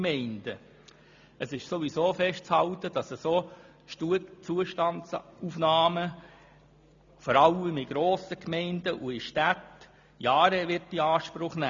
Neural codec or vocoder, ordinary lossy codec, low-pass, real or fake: none; MP3, 64 kbps; 7.2 kHz; real